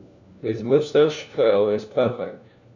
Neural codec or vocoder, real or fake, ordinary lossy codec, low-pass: codec, 16 kHz, 1 kbps, FunCodec, trained on LibriTTS, 50 frames a second; fake; none; 7.2 kHz